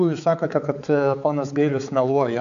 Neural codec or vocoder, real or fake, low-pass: codec, 16 kHz, 4 kbps, X-Codec, HuBERT features, trained on balanced general audio; fake; 7.2 kHz